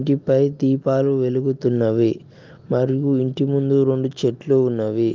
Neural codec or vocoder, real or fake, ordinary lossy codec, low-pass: none; real; Opus, 32 kbps; 7.2 kHz